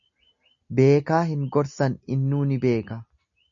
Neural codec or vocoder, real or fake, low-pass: none; real; 7.2 kHz